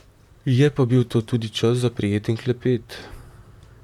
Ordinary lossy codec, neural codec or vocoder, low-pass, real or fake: none; vocoder, 44.1 kHz, 128 mel bands, Pupu-Vocoder; 19.8 kHz; fake